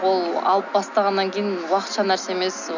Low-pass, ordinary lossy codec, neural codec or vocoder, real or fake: 7.2 kHz; none; none; real